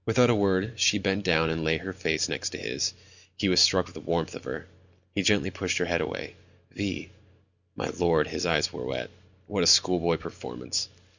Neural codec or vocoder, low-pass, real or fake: none; 7.2 kHz; real